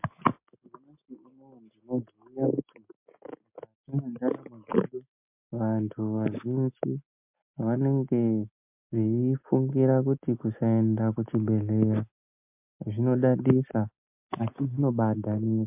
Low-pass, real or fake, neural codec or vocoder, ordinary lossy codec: 3.6 kHz; real; none; MP3, 32 kbps